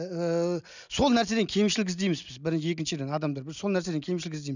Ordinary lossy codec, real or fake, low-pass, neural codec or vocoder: none; real; 7.2 kHz; none